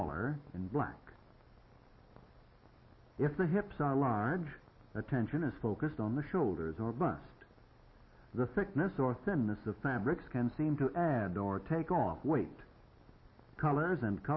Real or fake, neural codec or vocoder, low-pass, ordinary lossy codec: real; none; 7.2 kHz; MP3, 24 kbps